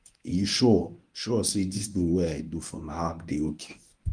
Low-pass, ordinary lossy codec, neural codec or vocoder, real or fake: 9.9 kHz; Opus, 32 kbps; codec, 24 kHz, 0.9 kbps, WavTokenizer, medium speech release version 1; fake